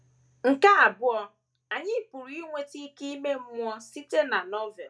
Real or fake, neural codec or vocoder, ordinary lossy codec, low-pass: real; none; none; none